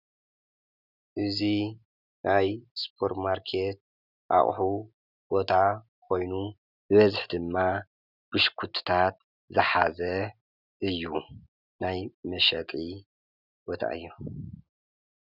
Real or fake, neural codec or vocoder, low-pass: real; none; 5.4 kHz